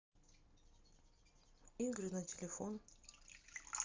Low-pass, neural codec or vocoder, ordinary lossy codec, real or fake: 7.2 kHz; none; Opus, 32 kbps; real